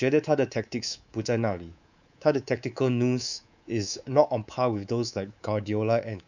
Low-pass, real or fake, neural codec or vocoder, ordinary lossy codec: 7.2 kHz; fake; codec, 24 kHz, 3.1 kbps, DualCodec; none